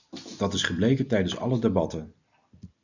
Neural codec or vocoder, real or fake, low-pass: none; real; 7.2 kHz